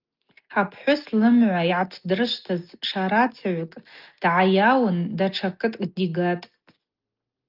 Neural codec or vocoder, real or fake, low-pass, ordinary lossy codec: none; real; 5.4 kHz; Opus, 32 kbps